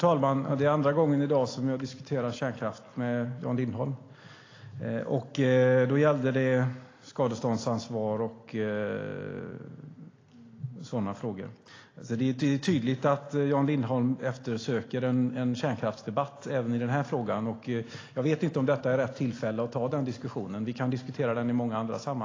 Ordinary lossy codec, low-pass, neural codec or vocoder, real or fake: AAC, 32 kbps; 7.2 kHz; none; real